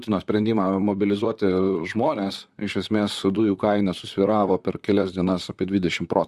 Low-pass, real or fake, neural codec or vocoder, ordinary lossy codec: 14.4 kHz; fake; vocoder, 44.1 kHz, 128 mel bands, Pupu-Vocoder; Opus, 64 kbps